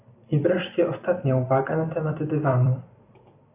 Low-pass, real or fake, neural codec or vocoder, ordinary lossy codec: 3.6 kHz; fake; vocoder, 44.1 kHz, 128 mel bands every 256 samples, BigVGAN v2; AAC, 24 kbps